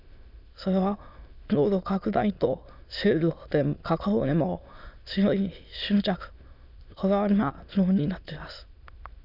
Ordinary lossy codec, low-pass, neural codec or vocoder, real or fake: none; 5.4 kHz; autoencoder, 22.05 kHz, a latent of 192 numbers a frame, VITS, trained on many speakers; fake